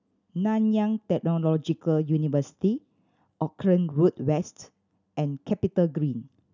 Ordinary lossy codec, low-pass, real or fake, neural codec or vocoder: none; 7.2 kHz; real; none